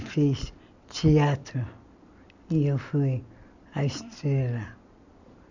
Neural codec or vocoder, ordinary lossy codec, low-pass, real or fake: none; none; 7.2 kHz; real